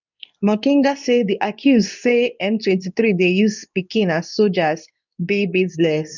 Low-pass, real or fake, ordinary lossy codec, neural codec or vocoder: 7.2 kHz; fake; none; codec, 24 kHz, 0.9 kbps, WavTokenizer, medium speech release version 2